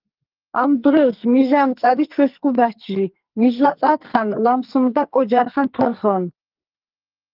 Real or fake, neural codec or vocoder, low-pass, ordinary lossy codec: fake; codec, 44.1 kHz, 2.6 kbps, SNAC; 5.4 kHz; Opus, 32 kbps